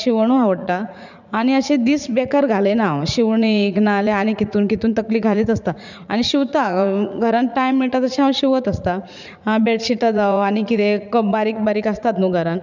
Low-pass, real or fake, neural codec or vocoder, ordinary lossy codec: 7.2 kHz; fake; vocoder, 44.1 kHz, 80 mel bands, Vocos; none